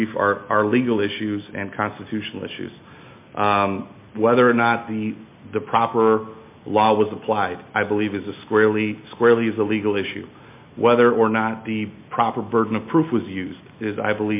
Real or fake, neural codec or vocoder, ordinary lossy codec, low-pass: real; none; MP3, 24 kbps; 3.6 kHz